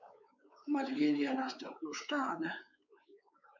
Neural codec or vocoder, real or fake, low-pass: codec, 16 kHz, 4 kbps, X-Codec, WavLM features, trained on Multilingual LibriSpeech; fake; 7.2 kHz